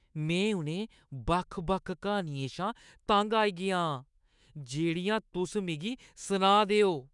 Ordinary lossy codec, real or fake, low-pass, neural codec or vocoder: none; fake; 10.8 kHz; autoencoder, 48 kHz, 128 numbers a frame, DAC-VAE, trained on Japanese speech